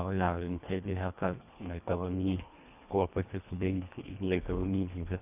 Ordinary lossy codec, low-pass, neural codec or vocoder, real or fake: none; 3.6 kHz; codec, 24 kHz, 1.5 kbps, HILCodec; fake